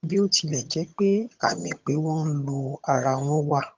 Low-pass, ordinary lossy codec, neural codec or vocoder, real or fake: 7.2 kHz; Opus, 24 kbps; vocoder, 22.05 kHz, 80 mel bands, HiFi-GAN; fake